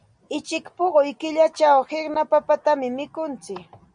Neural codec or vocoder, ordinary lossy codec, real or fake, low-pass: none; MP3, 96 kbps; real; 9.9 kHz